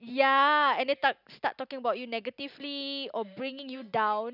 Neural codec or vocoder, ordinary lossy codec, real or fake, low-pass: none; none; real; 5.4 kHz